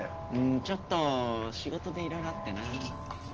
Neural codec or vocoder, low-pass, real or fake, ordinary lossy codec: none; 7.2 kHz; real; Opus, 16 kbps